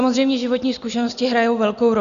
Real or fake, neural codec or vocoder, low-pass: real; none; 7.2 kHz